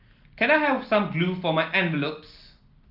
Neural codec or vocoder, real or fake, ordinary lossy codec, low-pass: none; real; Opus, 24 kbps; 5.4 kHz